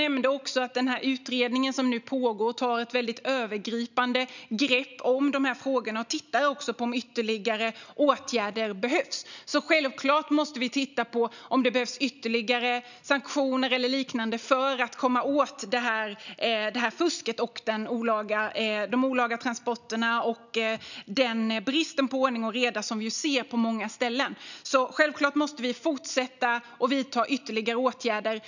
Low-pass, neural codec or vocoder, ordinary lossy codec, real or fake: 7.2 kHz; none; none; real